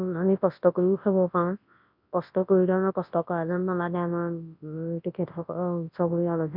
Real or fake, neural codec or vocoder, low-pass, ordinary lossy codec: fake; codec, 24 kHz, 0.9 kbps, WavTokenizer, large speech release; 5.4 kHz; MP3, 48 kbps